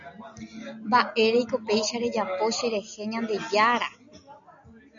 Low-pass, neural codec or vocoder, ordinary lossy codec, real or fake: 7.2 kHz; none; MP3, 96 kbps; real